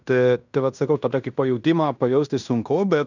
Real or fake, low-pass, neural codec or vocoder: fake; 7.2 kHz; codec, 16 kHz in and 24 kHz out, 0.9 kbps, LongCat-Audio-Codec, fine tuned four codebook decoder